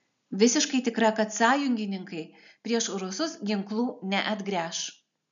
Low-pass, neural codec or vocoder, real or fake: 7.2 kHz; none; real